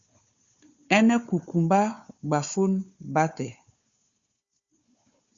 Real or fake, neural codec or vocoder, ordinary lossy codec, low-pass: fake; codec, 16 kHz, 4 kbps, FunCodec, trained on Chinese and English, 50 frames a second; Opus, 64 kbps; 7.2 kHz